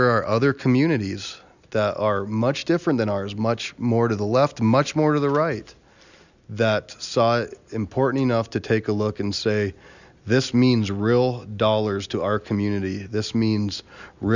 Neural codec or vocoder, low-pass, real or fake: none; 7.2 kHz; real